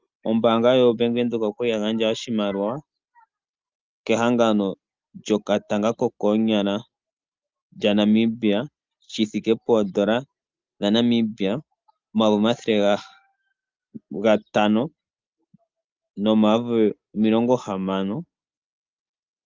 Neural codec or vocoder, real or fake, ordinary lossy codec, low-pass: none; real; Opus, 24 kbps; 7.2 kHz